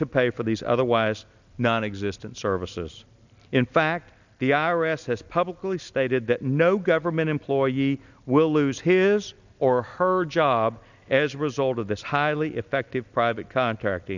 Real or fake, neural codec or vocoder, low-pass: real; none; 7.2 kHz